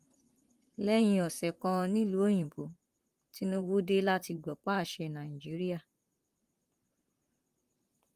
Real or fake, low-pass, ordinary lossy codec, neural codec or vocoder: fake; 14.4 kHz; Opus, 32 kbps; vocoder, 44.1 kHz, 128 mel bands, Pupu-Vocoder